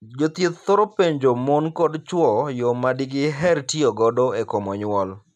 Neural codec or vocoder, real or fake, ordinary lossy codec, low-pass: none; real; none; 14.4 kHz